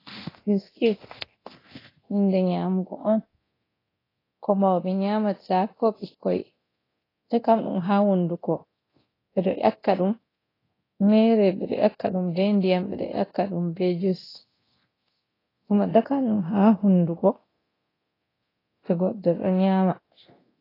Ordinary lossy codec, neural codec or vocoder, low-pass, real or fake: AAC, 24 kbps; codec, 24 kHz, 0.9 kbps, DualCodec; 5.4 kHz; fake